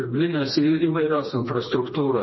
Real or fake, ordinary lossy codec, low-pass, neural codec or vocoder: fake; MP3, 24 kbps; 7.2 kHz; codec, 16 kHz, 2 kbps, FreqCodec, smaller model